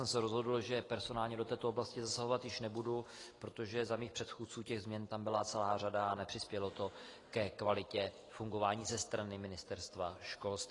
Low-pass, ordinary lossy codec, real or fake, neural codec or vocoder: 10.8 kHz; AAC, 32 kbps; real; none